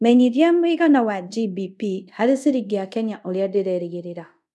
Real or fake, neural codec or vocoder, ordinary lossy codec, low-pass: fake; codec, 24 kHz, 0.5 kbps, DualCodec; none; none